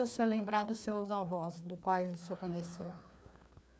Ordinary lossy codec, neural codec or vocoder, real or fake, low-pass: none; codec, 16 kHz, 2 kbps, FreqCodec, larger model; fake; none